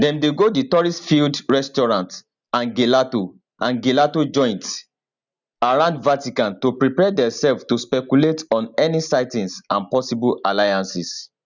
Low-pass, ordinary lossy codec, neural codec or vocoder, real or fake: 7.2 kHz; none; none; real